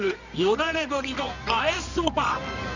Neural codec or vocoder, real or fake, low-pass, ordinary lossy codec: codec, 24 kHz, 0.9 kbps, WavTokenizer, medium music audio release; fake; 7.2 kHz; none